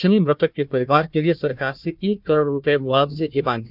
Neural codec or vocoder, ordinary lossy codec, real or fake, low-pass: codec, 16 kHz, 1 kbps, FunCodec, trained on Chinese and English, 50 frames a second; none; fake; 5.4 kHz